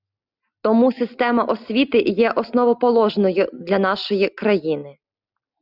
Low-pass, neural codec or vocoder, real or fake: 5.4 kHz; none; real